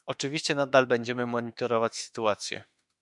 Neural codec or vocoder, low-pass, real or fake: autoencoder, 48 kHz, 32 numbers a frame, DAC-VAE, trained on Japanese speech; 10.8 kHz; fake